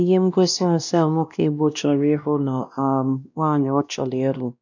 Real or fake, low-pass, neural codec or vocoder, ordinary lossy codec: fake; 7.2 kHz; codec, 16 kHz, 1 kbps, X-Codec, WavLM features, trained on Multilingual LibriSpeech; none